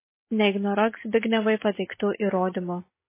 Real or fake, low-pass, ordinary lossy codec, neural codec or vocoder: real; 3.6 kHz; MP3, 16 kbps; none